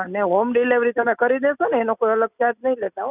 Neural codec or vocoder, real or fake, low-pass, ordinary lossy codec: none; real; 3.6 kHz; none